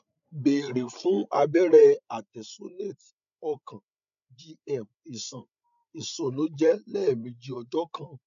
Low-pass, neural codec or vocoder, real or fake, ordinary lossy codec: 7.2 kHz; codec, 16 kHz, 8 kbps, FreqCodec, larger model; fake; none